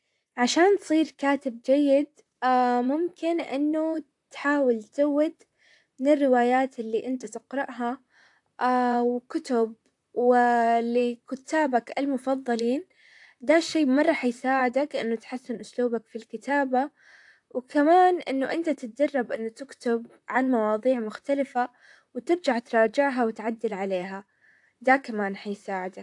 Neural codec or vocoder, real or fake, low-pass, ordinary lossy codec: vocoder, 44.1 kHz, 128 mel bands, Pupu-Vocoder; fake; 10.8 kHz; AAC, 64 kbps